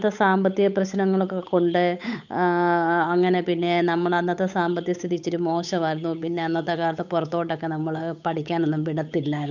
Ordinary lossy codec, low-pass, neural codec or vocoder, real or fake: none; 7.2 kHz; codec, 16 kHz, 8 kbps, FunCodec, trained on Chinese and English, 25 frames a second; fake